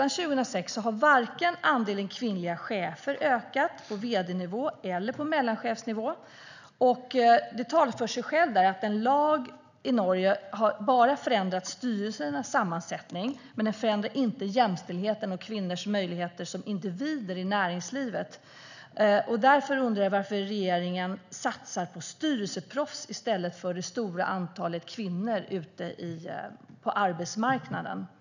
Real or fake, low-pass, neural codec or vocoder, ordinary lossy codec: real; 7.2 kHz; none; none